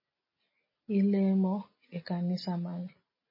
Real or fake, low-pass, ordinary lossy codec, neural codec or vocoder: real; 5.4 kHz; MP3, 24 kbps; none